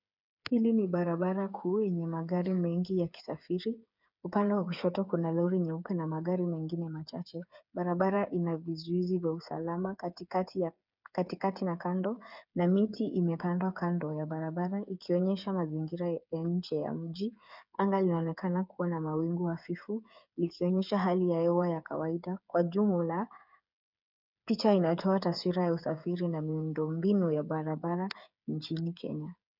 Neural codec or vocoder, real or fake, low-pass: codec, 16 kHz, 8 kbps, FreqCodec, smaller model; fake; 5.4 kHz